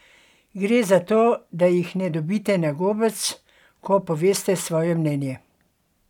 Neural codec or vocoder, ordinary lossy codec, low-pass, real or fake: none; none; 19.8 kHz; real